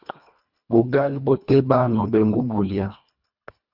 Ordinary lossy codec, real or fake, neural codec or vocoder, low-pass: Opus, 64 kbps; fake; codec, 24 kHz, 1.5 kbps, HILCodec; 5.4 kHz